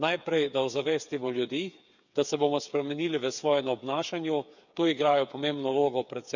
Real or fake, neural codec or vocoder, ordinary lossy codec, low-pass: fake; codec, 16 kHz, 8 kbps, FreqCodec, smaller model; none; 7.2 kHz